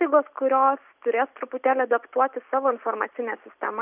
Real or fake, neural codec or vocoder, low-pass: real; none; 3.6 kHz